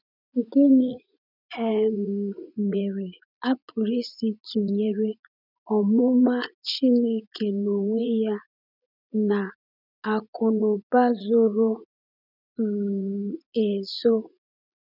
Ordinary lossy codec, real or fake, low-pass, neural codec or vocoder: none; fake; 5.4 kHz; vocoder, 44.1 kHz, 80 mel bands, Vocos